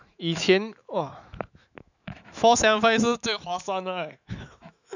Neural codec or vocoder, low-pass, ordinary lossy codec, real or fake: autoencoder, 48 kHz, 128 numbers a frame, DAC-VAE, trained on Japanese speech; 7.2 kHz; none; fake